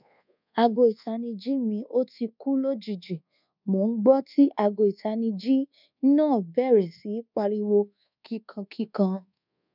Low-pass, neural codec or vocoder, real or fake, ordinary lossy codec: 5.4 kHz; codec, 24 kHz, 1.2 kbps, DualCodec; fake; none